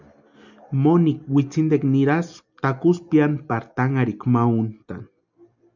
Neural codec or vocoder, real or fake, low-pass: none; real; 7.2 kHz